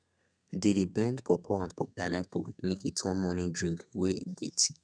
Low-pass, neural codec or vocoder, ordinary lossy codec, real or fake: 9.9 kHz; codec, 32 kHz, 1.9 kbps, SNAC; none; fake